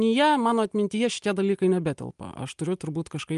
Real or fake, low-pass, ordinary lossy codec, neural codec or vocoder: real; 10.8 kHz; Opus, 32 kbps; none